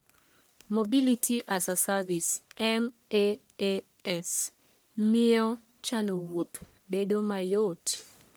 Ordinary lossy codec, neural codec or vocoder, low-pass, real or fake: none; codec, 44.1 kHz, 1.7 kbps, Pupu-Codec; none; fake